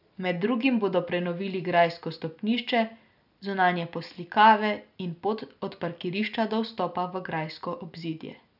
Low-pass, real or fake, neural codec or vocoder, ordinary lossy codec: 5.4 kHz; real; none; none